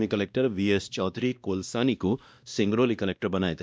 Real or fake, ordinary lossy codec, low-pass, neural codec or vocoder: fake; none; none; codec, 16 kHz, 1 kbps, X-Codec, WavLM features, trained on Multilingual LibriSpeech